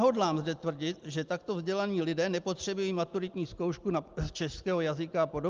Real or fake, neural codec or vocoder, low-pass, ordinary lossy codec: real; none; 7.2 kHz; Opus, 32 kbps